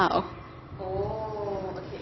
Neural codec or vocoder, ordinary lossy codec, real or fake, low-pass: none; MP3, 24 kbps; real; 7.2 kHz